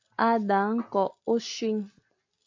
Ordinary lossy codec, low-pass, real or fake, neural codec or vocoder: AAC, 48 kbps; 7.2 kHz; real; none